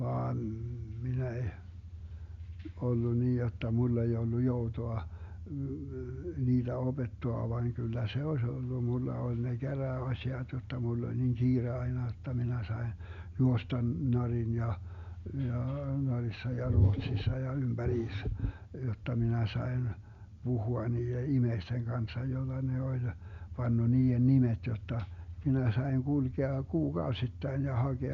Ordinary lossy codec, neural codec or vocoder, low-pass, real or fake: Opus, 64 kbps; none; 7.2 kHz; real